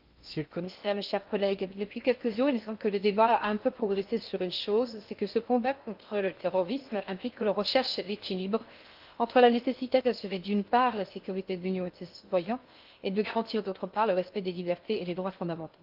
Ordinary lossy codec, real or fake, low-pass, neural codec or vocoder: Opus, 32 kbps; fake; 5.4 kHz; codec, 16 kHz in and 24 kHz out, 0.6 kbps, FocalCodec, streaming, 2048 codes